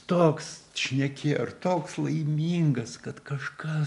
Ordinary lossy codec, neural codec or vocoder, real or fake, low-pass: MP3, 96 kbps; none; real; 10.8 kHz